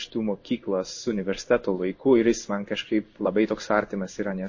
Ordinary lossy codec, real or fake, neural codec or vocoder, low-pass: MP3, 32 kbps; fake; codec, 16 kHz in and 24 kHz out, 1 kbps, XY-Tokenizer; 7.2 kHz